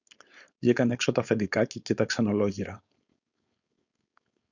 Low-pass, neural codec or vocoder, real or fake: 7.2 kHz; codec, 16 kHz, 4.8 kbps, FACodec; fake